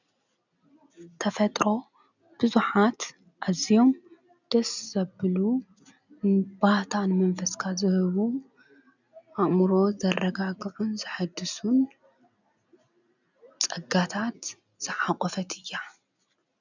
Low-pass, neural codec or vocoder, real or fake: 7.2 kHz; none; real